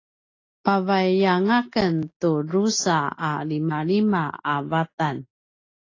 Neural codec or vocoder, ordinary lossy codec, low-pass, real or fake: none; AAC, 32 kbps; 7.2 kHz; real